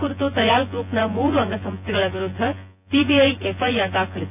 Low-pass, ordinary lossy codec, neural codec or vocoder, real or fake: 3.6 kHz; none; vocoder, 24 kHz, 100 mel bands, Vocos; fake